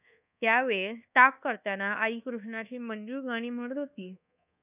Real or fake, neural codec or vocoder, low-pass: fake; codec, 24 kHz, 1.2 kbps, DualCodec; 3.6 kHz